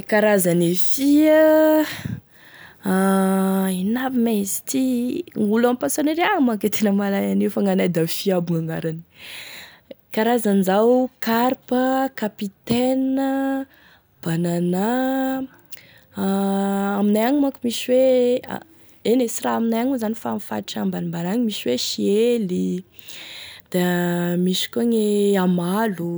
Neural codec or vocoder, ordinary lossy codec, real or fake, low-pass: none; none; real; none